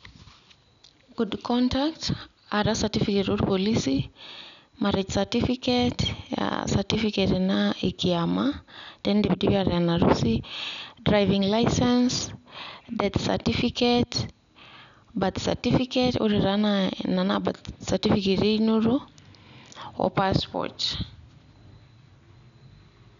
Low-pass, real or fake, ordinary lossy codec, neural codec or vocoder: 7.2 kHz; real; none; none